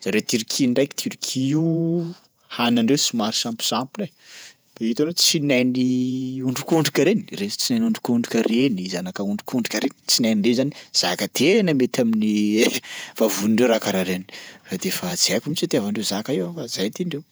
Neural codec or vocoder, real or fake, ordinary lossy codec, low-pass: vocoder, 48 kHz, 128 mel bands, Vocos; fake; none; none